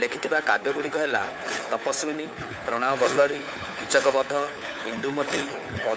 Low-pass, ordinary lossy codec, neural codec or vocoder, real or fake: none; none; codec, 16 kHz, 4 kbps, FunCodec, trained on LibriTTS, 50 frames a second; fake